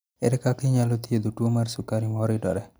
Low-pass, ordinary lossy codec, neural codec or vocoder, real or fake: none; none; none; real